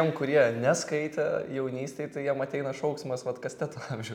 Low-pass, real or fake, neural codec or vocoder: 19.8 kHz; real; none